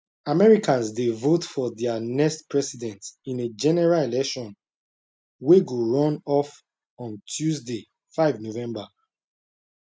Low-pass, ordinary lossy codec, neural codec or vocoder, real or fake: none; none; none; real